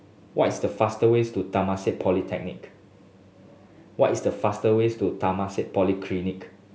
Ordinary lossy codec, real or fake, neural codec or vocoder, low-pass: none; real; none; none